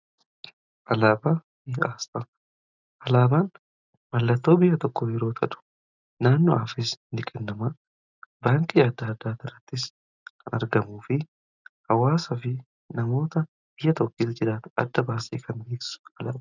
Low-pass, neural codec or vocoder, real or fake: 7.2 kHz; none; real